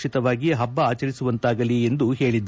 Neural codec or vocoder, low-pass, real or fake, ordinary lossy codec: none; none; real; none